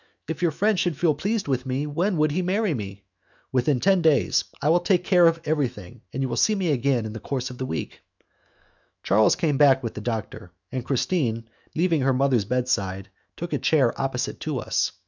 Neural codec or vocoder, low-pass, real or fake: autoencoder, 48 kHz, 128 numbers a frame, DAC-VAE, trained on Japanese speech; 7.2 kHz; fake